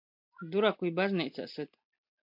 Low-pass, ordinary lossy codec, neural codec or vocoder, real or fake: 5.4 kHz; MP3, 48 kbps; none; real